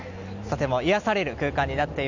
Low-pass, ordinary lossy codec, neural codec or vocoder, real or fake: 7.2 kHz; none; none; real